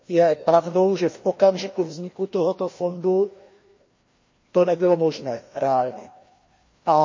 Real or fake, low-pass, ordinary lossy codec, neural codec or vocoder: fake; 7.2 kHz; MP3, 32 kbps; codec, 16 kHz, 1 kbps, FreqCodec, larger model